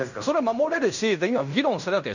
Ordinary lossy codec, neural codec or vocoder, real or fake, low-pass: none; codec, 16 kHz in and 24 kHz out, 0.9 kbps, LongCat-Audio-Codec, fine tuned four codebook decoder; fake; 7.2 kHz